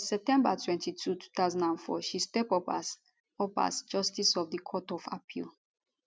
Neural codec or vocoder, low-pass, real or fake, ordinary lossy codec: none; none; real; none